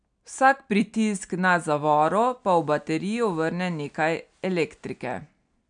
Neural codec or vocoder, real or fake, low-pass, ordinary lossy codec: none; real; 9.9 kHz; none